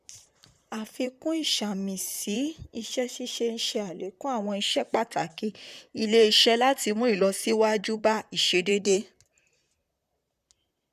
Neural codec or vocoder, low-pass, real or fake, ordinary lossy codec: vocoder, 44.1 kHz, 128 mel bands, Pupu-Vocoder; 14.4 kHz; fake; none